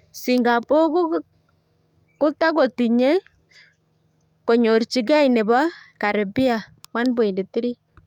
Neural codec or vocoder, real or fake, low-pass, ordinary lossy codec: codec, 44.1 kHz, 7.8 kbps, DAC; fake; 19.8 kHz; none